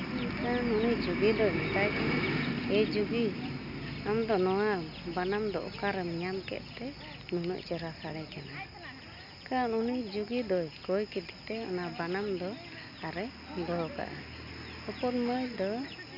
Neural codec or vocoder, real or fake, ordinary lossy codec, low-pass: none; real; none; 5.4 kHz